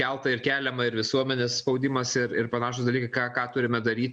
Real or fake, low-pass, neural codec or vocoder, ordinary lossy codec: real; 9.9 kHz; none; Opus, 64 kbps